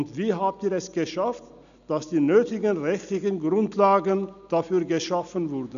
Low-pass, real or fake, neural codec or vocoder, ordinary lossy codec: 7.2 kHz; real; none; none